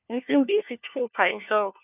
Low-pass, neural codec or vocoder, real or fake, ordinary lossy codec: 3.6 kHz; codec, 16 kHz, 1 kbps, FunCodec, trained on LibriTTS, 50 frames a second; fake; none